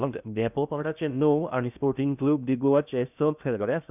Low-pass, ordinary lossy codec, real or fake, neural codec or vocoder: 3.6 kHz; none; fake; codec, 16 kHz in and 24 kHz out, 0.6 kbps, FocalCodec, streaming, 2048 codes